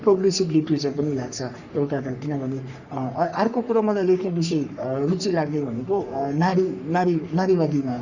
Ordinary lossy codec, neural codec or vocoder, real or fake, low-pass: Opus, 64 kbps; codec, 44.1 kHz, 3.4 kbps, Pupu-Codec; fake; 7.2 kHz